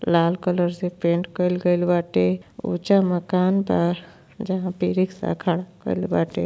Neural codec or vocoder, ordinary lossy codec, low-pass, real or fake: none; none; none; real